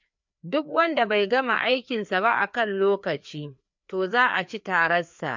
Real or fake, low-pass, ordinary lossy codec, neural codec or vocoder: fake; 7.2 kHz; MP3, 64 kbps; codec, 16 kHz, 2 kbps, FreqCodec, larger model